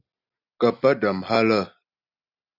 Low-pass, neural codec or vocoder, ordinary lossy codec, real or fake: 5.4 kHz; none; Opus, 64 kbps; real